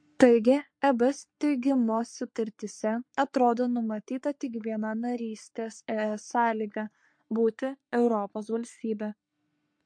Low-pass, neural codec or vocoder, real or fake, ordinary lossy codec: 9.9 kHz; codec, 44.1 kHz, 3.4 kbps, Pupu-Codec; fake; MP3, 48 kbps